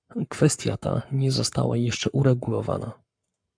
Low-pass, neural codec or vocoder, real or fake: 9.9 kHz; codec, 44.1 kHz, 7.8 kbps, Pupu-Codec; fake